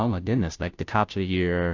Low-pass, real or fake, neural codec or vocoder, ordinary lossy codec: 7.2 kHz; fake; codec, 16 kHz, 0.5 kbps, FunCodec, trained on Chinese and English, 25 frames a second; AAC, 48 kbps